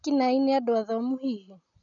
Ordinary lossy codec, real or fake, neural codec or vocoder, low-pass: none; real; none; 7.2 kHz